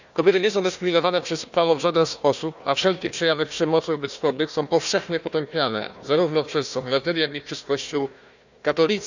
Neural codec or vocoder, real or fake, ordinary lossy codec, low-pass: codec, 16 kHz, 1 kbps, FunCodec, trained on Chinese and English, 50 frames a second; fake; none; 7.2 kHz